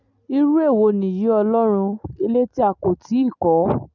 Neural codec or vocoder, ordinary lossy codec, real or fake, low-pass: none; none; real; 7.2 kHz